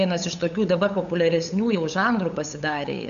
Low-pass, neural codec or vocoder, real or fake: 7.2 kHz; codec, 16 kHz, 8 kbps, FunCodec, trained on LibriTTS, 25 frames a second; fake